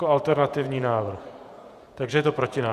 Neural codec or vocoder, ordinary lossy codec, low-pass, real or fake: vocoder, 48 kHz, 128 mel bands, Vocos; Opus, 64 kbps; 14.4 kHz; fake